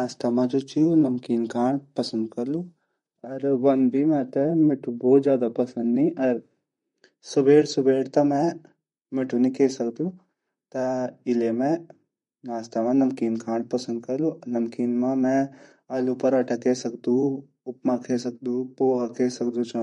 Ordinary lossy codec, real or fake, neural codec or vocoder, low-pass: MP3, 48 kbps; fake; vocoder, 44.1 kHz, 128 mel bands, Pupu-Vocoder; 19.8 kHz